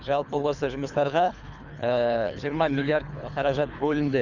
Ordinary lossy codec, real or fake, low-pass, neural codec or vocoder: none; fake; 7.2 kHz; codec, 24 kHz, 3 kbps, HILCodec